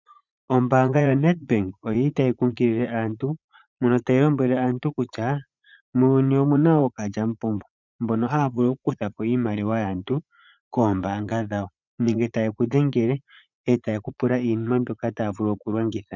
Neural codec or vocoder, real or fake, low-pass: vocoder, 44.1 kHz, 80 mel bands, Vocos; fake; 7.2 kHz